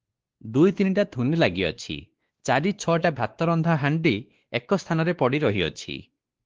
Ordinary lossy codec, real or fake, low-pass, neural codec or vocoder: Opus, 16 kbps; fake; 7.2 kHz; codec, 16 kHz, 2 kbps, X-Codec, WavLM features, trained on Multilingual LibriSpeech